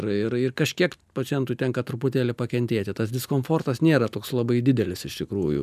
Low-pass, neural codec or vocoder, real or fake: 14.4 kHz; none; real